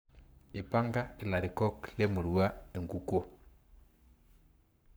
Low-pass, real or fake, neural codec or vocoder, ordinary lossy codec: none; fake; codec, 44.1 kHz, 7.8 kbps, Pupu-Codec; none